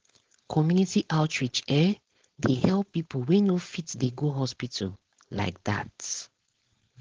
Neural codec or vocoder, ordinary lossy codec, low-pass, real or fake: codec, 16 kHz, 4.8 kbps, FACodec; Opus, 16 kbps; 7.2 kHz; fake